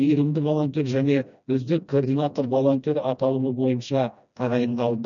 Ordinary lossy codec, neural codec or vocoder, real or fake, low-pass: none; codec, 16 kHz, 1 kbps, FreqCodec, smaller model; fake; 7.2 kHz